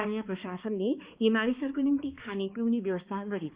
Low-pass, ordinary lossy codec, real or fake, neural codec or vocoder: 3.6 kHz; Opus, 64 kbps; fake; codec, 16 kHz, 2 kbps, X-Codec, HuBERT features, trained on balanced general audio